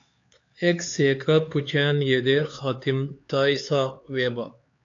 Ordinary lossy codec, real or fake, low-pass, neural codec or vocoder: AAC, 48 kbps; fake; 7.2 kHz; codec, 16 kHz, 4 kbps, X-Codec, HuBERT features, trained on LibriSpeech